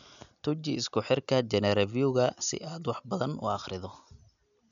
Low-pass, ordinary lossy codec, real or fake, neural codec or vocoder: 7.2 kHz; none; real; none